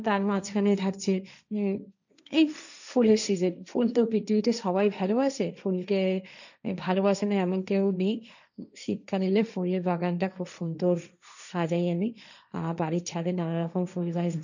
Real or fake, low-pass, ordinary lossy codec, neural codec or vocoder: fake; 7.2 kHz; none; codec, 16 kHz, 1.1 kbps, Voila-Tokenizer